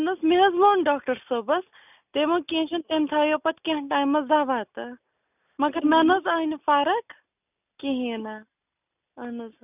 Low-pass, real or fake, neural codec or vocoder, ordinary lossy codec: 3.6 kHz; real; none; none